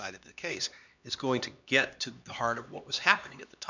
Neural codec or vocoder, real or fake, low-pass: codec, 16 kHz, 2 kbps, X-Codec, HuBERT features, trained on LibriSpeech; fake; 7.2 kHz